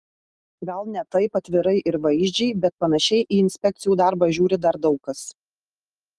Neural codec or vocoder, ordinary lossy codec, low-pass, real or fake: none; Opus, 24 kbps; 10.8 kHz; real